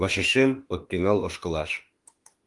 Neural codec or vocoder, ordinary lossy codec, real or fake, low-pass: autoencoder, 48 kHz, 32 numbers a frame, DAC-VAE, trained on Japanese speech; Opus, 24 kbps; fake; 10.8 kHz